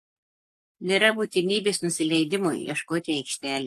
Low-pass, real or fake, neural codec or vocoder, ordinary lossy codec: 14.4 kHz; fake; codec, 44.1 kHz, 7.8 kbps, Pupu-Codec; AAC, 64 kbps